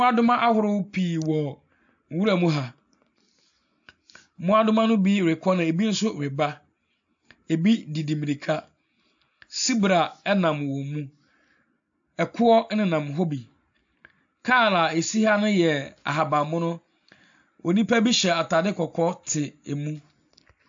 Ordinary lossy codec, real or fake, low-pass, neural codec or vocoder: AAC, 64 kbps; real; 7.2 kHz; none